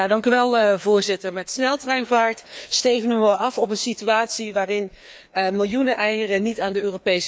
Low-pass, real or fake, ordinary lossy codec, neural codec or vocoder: none; fake; none; codec, 16 kHz, 2 kbps, FreqCodec, larger model